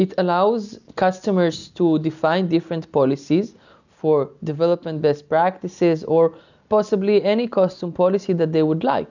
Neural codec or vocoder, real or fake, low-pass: none; real; 7.2 kHz